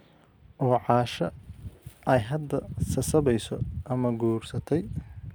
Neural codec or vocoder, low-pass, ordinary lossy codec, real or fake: none; none; none; real